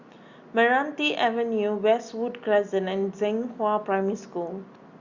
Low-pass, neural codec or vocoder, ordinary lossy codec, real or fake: 7.2 kHz; none; Opus, 64 kbps; real